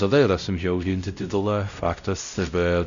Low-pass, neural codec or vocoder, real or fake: 7.2 kHz; codec, 16 kHz, 0.5 kbps, X-Codec, WavLM features, trained on Multilingual LibriSpeech; fake